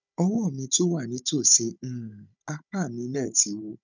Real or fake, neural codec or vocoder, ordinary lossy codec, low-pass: fake; codec, 16 kHz, 16 kbps, FunCodec, trained on Chinese and English, 50 frames a second; none; 7.2 kHz